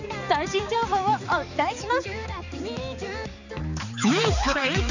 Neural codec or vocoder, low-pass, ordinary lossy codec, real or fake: codec, 16 kHz, 4 kbps, X-Codec, HuBERT features, trained on balanced general audio; 7.2 kHz; none; fake